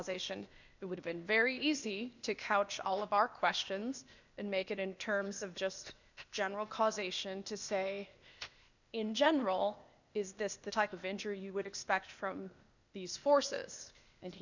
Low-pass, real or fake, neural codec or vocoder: 7.2 kHz; fake; codec, 16 kHz, 0.8 kbps, ZipCodec